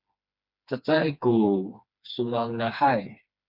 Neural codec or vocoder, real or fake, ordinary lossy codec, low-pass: codec, 16 kHz, 2 kbps, FreqCodec, smaller model; fake; Opus, 64 kbps; 5.4 kHz